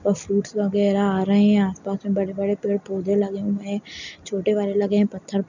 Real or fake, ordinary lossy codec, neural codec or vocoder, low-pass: real; none; none; 7.2 kHz